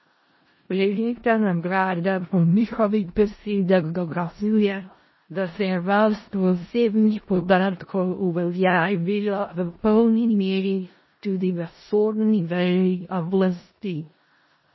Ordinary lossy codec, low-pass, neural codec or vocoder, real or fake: MP3, 24 kbps; 7.2 kHz; codec, 16 kHz in and 24 kHz out, 0.4 kbps, LongCat-Audio-Codec, four codebook decoder; fake